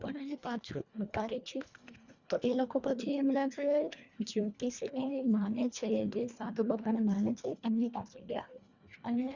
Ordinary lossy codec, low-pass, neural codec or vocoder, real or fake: Opus, 64 kbps; 7.2 kHz; codec, 24 kHz, 1.5 kbps, HILCodec; fake